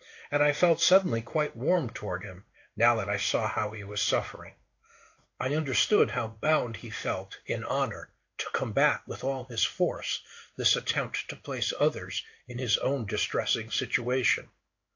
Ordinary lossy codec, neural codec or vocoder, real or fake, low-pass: AAC, 48 kbps; codec, 16 kHz in and 24 kHz out, 1 kbps, XY-Tokenizer; fake; 7.2 kHz